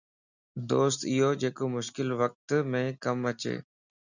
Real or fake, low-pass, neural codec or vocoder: real; 7.2 kHz; none